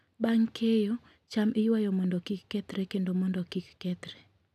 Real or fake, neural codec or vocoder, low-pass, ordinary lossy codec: real; none; 14.4 kHz; none